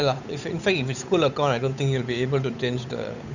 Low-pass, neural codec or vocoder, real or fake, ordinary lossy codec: 7.2 kHz; codec, 16 kHz, 8 kbps, FunCodec, trained on Chinese and English, 25 frames a second; fake; none